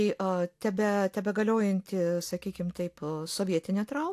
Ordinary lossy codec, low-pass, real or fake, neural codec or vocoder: AAC, 64 kbps; 14.4 kHz; real; none